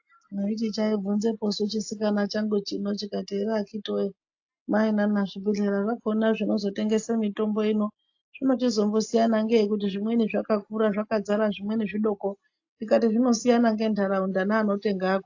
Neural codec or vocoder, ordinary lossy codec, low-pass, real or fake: none; AAC, 48 kbps; 7.2 kHz; real